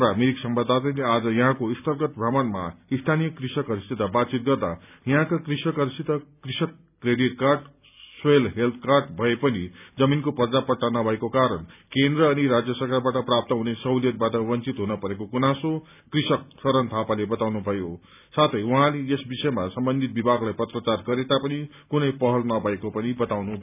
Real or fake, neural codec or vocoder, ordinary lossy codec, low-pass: real; none; none; 3.6 kHz